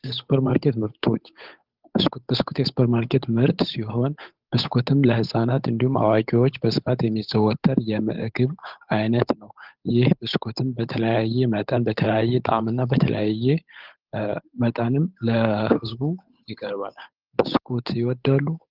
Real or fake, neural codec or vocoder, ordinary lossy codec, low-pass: fake; codec, 16 kHz, 8 kbps, FunCodec, trained on Chinese and English, 25 frames a second; Opus, 32 kbps; 5.4 kHz